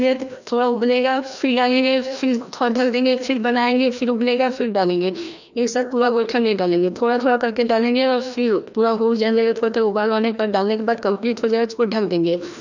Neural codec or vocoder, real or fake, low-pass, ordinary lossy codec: codec, 16 kHz, 1 kbps, FreqCodec, larger model; fake; 7.2 kHz; none